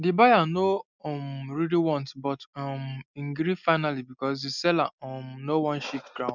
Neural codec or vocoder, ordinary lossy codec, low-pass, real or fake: none; none; 7.2 kHz; real